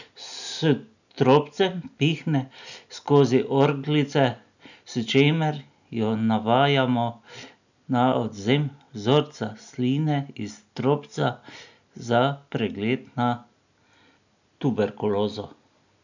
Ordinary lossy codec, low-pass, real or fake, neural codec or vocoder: none; 7.2 kHz; real; none